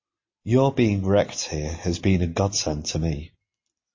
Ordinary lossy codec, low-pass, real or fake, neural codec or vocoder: MP3, 32 kbps; 7.2 kHz; real; none